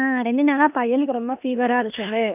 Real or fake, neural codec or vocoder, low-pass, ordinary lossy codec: fake; codec, 16 kHz in and 24 kHz out, 0.9 kbps, LongCat-Audio-Codec, four codebook decoder; 3.6 kHz; none